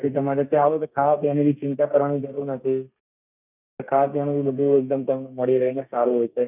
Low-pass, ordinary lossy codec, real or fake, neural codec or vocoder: 3.6 kHz; none; fake; codec, 32 kHz, 1.9 kbps, SNAC